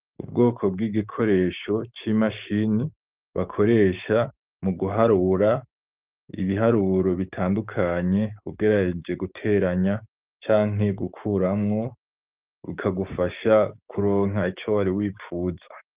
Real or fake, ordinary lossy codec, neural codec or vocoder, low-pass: fake; Opus, 32 kbps; codec, 16 kHz, 6 kbps, DAC; 3.6 kHz